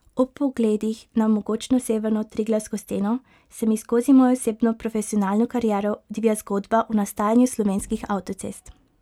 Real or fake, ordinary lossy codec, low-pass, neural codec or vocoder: real; none; 19.8 kHz; none